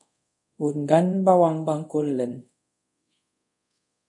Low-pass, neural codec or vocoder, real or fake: 10.8 kHz; codec, 24 kHz, 0.5 kbps, DualCodec; fake